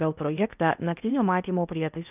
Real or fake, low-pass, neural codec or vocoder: fake; 3.6 kHz; codec, 16 kHz in and 24 kHz out, 0.8 kbps, FocalCodec, streaming, 65536 codes